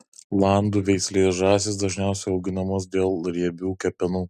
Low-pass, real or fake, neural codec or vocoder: 14.4 kHz; real; none